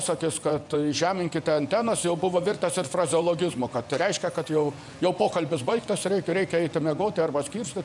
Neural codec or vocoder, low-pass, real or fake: vocoder, 24 kHz, 100 mel bands, Vocos; 10.8 kHz; fake